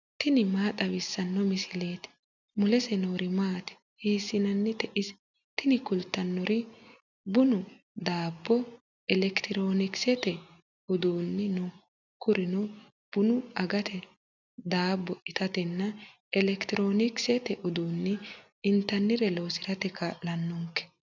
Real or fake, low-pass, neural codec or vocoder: real; 7.2 kHz; none